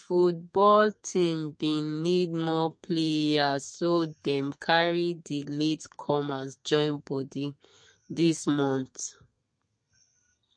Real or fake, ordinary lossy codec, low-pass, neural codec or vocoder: fake; MP3, 48 kbps; 9.9 kHz; codec, 32 kHz, 1.9 kbps, SNAC